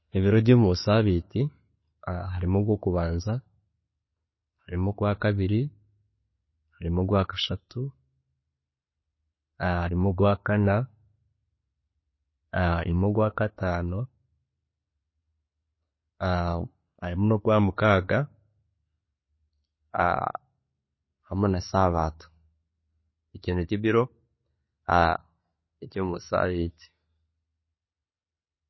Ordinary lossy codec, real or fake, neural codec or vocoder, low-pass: MP3, 24 kbps; real; none; 7.2 kHz